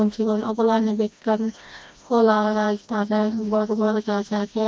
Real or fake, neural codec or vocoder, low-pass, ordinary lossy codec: fake; codec, 16 kHz, 1 kbps, FreqCodec, smaller model; none; none